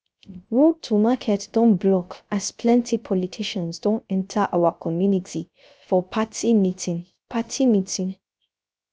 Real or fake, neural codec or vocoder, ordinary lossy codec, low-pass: fake; codec, 16 kHz, 0.3 kbps, FocalCodec; none; none